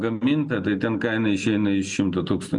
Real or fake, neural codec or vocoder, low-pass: real; none; 10.8 kHz